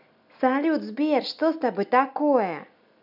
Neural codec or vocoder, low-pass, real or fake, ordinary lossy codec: none; 5.4 kHz; real; none